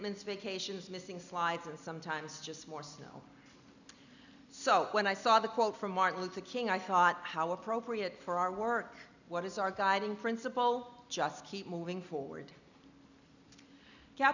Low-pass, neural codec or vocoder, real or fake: 7.2 kHz; none; real